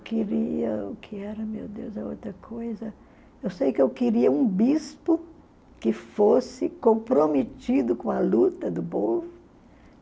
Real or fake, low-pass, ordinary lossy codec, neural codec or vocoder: real; none; none; none